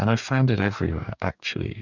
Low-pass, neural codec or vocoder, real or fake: 7.2 kHz; codec, 44.1 kHz, 2.6 kbps, DAC; fake